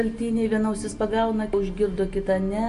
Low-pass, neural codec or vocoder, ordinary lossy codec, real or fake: 10.8 kHz; none; AAC, 64 kbps; real